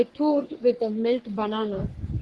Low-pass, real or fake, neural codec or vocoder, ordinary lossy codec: 10.8 kHz; fake; codec, 44.1 kHz, 3.4 kbps, Pupu-Codec; Opus, 16 kbps